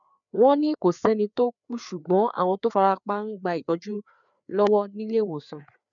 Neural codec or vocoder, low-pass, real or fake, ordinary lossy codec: codec, 16 kHz, 4 kbps, FreqCodec, larger model; 7.2 kHz; fake; none